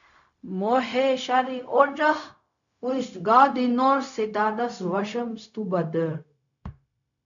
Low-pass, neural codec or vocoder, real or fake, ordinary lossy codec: 7.2 kHz; codec, 16 kHz, 0.4 kbps, LongCat-Audio-Codec; fake; MP3, 96 kbps